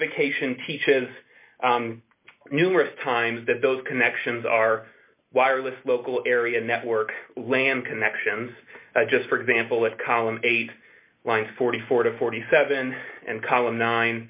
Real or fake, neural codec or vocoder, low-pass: real; none; 3.6 kHz